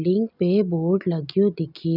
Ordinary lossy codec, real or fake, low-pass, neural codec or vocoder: none; real; 5.4 kHz; none